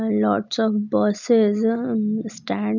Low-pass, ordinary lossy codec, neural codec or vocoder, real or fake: 7.2 kHz; none; none; real